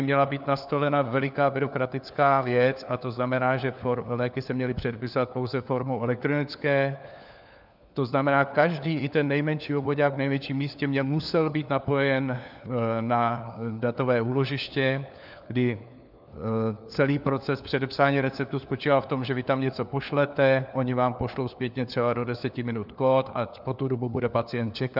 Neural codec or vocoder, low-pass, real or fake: codec, 16 kHz, 4 kbps, FunCodec, trained on LibriTTS, 50 frames a second; 5.4 kHz; fake